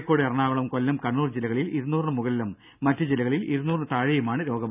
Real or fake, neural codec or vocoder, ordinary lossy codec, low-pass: real; none; none; 3.6 kHz